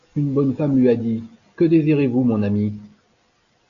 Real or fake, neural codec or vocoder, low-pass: real; none; 7.2 kHz